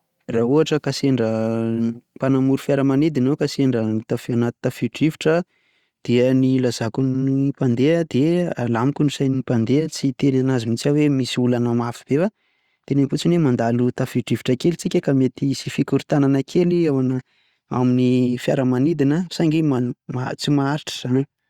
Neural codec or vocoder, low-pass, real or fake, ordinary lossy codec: none; 19.8 kHz; real; Opus, 64 kbps